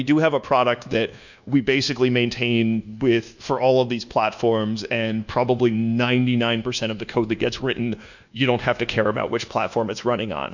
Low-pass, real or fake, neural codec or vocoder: 7.2 kHz; fake; codec, 24 kHz, 1.2 kbps, DualCodec